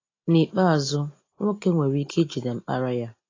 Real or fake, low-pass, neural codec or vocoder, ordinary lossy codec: real; 7.2 kHz; none; AAC, 32 kbps